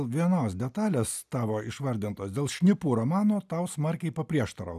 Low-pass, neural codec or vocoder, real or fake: 14.4 kHz; none; real